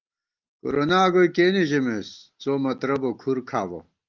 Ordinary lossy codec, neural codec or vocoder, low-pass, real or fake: Opus, 32 kbps; none; 7.2 kHz; real